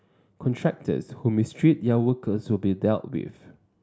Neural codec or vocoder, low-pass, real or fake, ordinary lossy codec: none; none; real; none